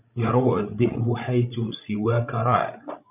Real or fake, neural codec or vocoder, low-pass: fake; codec, 16 kHz, 16 kbps, FreqCodec, larger model; 3.6 kHz